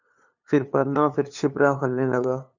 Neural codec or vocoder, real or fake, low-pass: codec, 16 kHz, 2 kbps, FunCodec, trained on LibriTTS, 25 frames a second; fake; 7.2 kHz